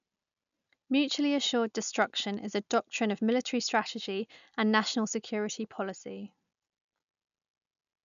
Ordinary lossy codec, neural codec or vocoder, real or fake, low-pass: none; none; real; 7.2 kHz